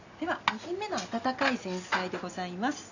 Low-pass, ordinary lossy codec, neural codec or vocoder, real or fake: 7.2 kHz; none; none; real